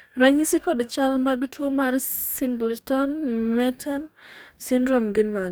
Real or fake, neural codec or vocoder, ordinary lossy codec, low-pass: fake; codec, 44.1 kHz, 2.6 kbps, DAC; none; none